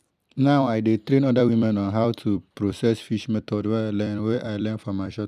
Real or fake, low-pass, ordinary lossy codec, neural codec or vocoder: fake; 14.4 kHz; none; vocoder, 44.1 kHz, 128 mel bands every 256 samples, BigVGAN v2